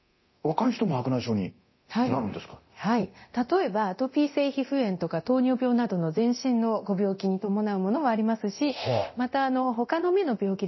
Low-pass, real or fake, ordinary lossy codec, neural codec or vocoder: 7.2 kHz; fake; MP3, 24 kbps; codec, 24 kHz, 0.9 kbps, DualCodec